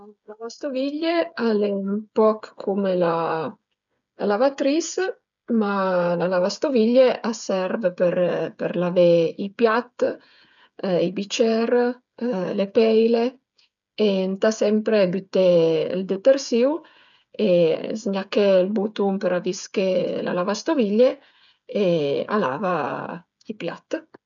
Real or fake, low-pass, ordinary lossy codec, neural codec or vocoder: fake; 7.2 kHz; none; codec, 16 kHz, 8 kbps, FreqCodec, smaller model